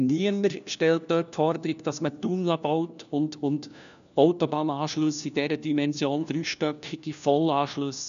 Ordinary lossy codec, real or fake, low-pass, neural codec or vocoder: none; fake; 7.2 kHz; codec, 16 kHz, 1 kbps, FunCodec, trained on LibriTTS, 50 frames a second